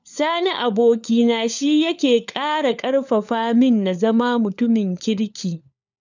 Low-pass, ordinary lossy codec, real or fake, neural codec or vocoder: 7.2 kHz; none; fake; codec, 16 kHz, 4 kbps, FunCodec, trained on LibriTTS, 50 frames a second